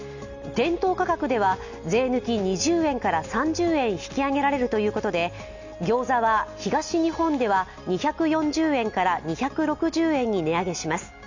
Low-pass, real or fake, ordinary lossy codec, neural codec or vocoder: 7.2 kHz; real; Opus, 64 kbps; none